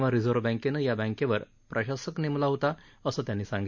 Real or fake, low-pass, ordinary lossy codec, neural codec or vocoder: real; 7.2 kHz; none; none